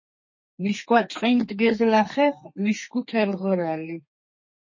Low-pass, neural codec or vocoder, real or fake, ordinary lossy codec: 7.2 kHz; codec, 32 kHz, 1.9 kbps, SNAC; fake; MP3, 32 kbps